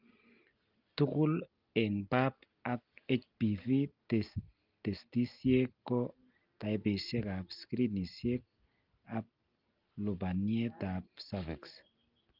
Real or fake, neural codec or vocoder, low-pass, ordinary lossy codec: real; none; 5.4 kHz; Opus, 24 kbps